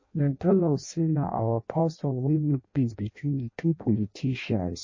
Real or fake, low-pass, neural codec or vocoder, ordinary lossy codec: fake; 7.2 kHz; codec, 16 kHz in and 24 kHz out, 0.6 kbps, FireRedTTS-2 codec; MP3, 32 kbps